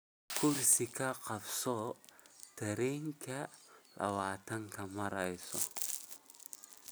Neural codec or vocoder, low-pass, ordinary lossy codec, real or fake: none; none; none; real